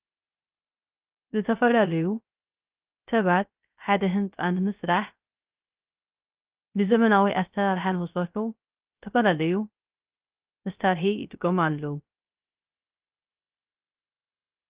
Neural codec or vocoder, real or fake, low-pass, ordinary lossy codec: codec, 16 kHz, 0.3 kbps, FocalCodec; fake; 3.6 kHz; Opus, 32 kbps